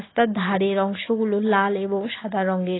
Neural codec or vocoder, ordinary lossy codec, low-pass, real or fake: none; AAC, 16 kbps; 7.2 kHz; real